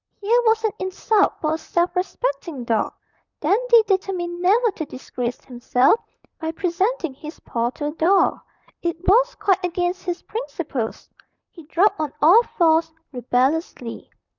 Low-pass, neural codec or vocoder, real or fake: 7.2 kHz; vocoder, 44.1 kHz, 128 mel bands, Pupu-Vocoder; fake